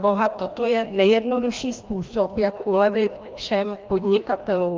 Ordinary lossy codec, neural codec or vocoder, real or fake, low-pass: Opus, 32 kbps; codec, 16 kHz, 1 kbps, FreqCodec, larger model; fake; 7.2 kHz